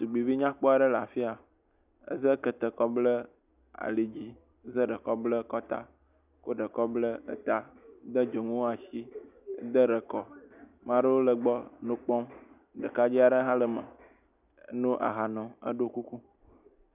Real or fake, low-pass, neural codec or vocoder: real; 3.6 kHz; none